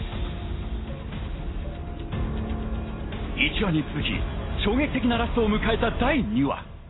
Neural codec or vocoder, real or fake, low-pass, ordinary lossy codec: none; real; 7.2 kHz; AAC, 16 kbps